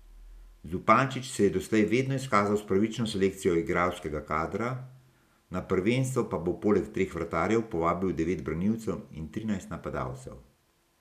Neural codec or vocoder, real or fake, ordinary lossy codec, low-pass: none; real; none; 14.4 kHz